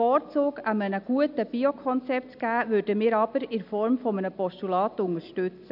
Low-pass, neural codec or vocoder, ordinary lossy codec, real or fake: 5.4 kHz; none; AAC, 48 kbps; real